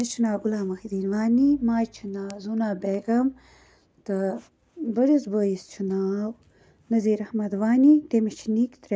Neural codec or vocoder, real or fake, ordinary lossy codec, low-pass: none; real; none; none